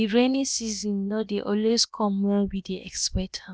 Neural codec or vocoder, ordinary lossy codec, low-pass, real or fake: codec, 16 kHz, about 1 kbps, DyCAST, with the encoder's durations; none; none; fake